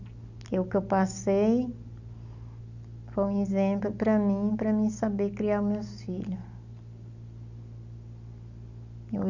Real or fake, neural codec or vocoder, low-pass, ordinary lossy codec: real; none; 7.2 kHz; none